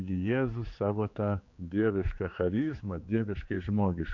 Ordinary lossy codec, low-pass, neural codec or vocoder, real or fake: MP3, 48 kbps; 7.2 kHz; codec, 16 kHz, 4 kbps, X-Codec, HuBERT features, trained on general audio; fake